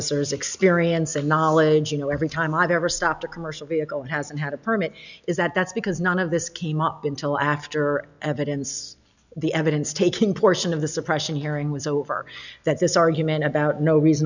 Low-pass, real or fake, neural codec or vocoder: 7.2 kHz; real; none